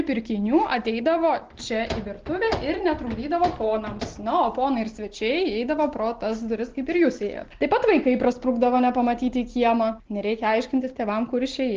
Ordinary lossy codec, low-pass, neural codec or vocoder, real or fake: Opus, 16 kbps; 7.2 kHz; none; real